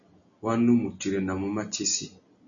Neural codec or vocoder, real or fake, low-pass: none; real; 7.2 kHz